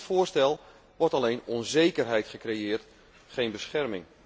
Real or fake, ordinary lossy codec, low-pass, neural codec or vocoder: real; none; none; none